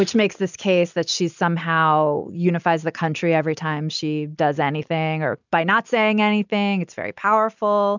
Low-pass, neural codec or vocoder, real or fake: 7.2 kHz; none; real